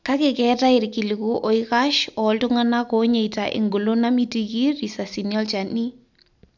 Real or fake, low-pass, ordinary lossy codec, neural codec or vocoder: real; 7.2 kHz; none; none